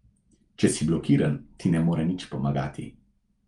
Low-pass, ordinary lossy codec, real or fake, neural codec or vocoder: 10.8 kHz; Opus, 32 kbps; real; none